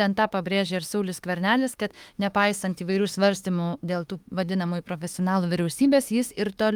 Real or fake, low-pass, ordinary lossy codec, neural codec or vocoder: fake; 19.8 kHz; Opus, 32 kbps; autoencoder, 48 kHz, 32 numbers a frame, DAC-VAE, trained on Japanese speech